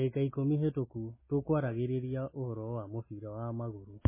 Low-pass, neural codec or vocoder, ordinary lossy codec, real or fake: 3.6 kHz; none; MP3, 16 kbps; real